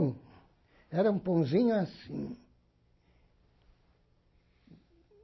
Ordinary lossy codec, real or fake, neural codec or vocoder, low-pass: MP3, 24 kbps; real; none; 7.2 kHz